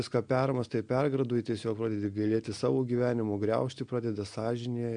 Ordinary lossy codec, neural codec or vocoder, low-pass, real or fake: MP3, 64 kbps; none; 9.9 kHz; real